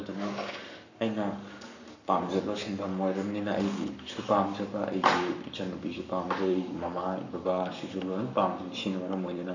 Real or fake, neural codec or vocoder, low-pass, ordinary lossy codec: fake; codec, 44.1 kHz, 7.8 kbps, Pupu-Codec; 7.2 kHz; none